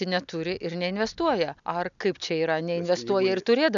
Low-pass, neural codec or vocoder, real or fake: 7.2 kHz; none; real